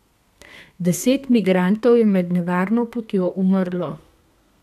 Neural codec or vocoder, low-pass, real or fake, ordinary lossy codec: codec, 32 kHz, 1.9 kbps, SNAC; 14.4 kHz; fake; none